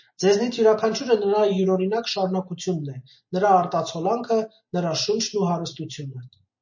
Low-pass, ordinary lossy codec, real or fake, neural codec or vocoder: 7.2 kHz; MP3, 32 kbps; real; none